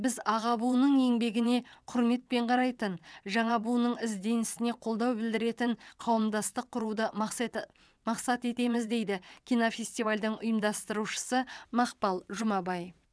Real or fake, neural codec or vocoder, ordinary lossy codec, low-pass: fake; vocoder, 22.05 kHz, 80 mel bands, WaveNeXt; none; none